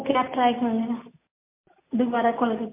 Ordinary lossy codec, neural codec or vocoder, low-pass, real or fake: MP3, 24 kbps; none; 3.6 kHz; real